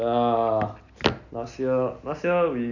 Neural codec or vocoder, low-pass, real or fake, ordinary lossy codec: none; 7.2 kHz; real; none